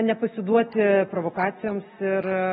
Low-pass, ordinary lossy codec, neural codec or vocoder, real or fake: 7.2 kHz; AAC, 16 kbps; none; real